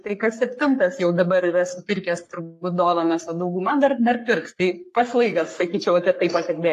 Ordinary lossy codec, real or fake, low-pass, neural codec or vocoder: AAC, 64 kbps; fake; 14.4 kHz; codec, 44.1 kHz, 3.4 kbps, Pupu-Codec